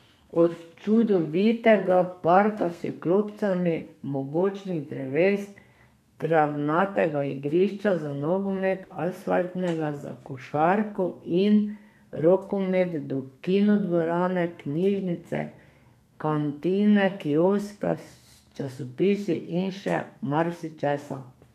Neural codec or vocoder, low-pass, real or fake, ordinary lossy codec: codec, 32 kHz, 1.9 kbps, SNAC; 14.4 kHz; fake; none